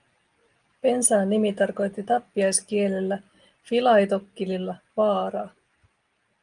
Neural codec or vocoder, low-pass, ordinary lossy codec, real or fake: none; 9.9 kHz; Opus, 32 kbps; real